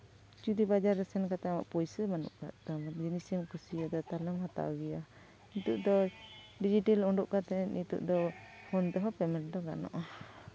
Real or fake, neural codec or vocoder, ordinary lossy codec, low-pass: real; none; none; none